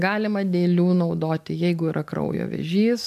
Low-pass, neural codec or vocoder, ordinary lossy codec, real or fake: 14.4 kHz; none; MP3, 96 kbps; real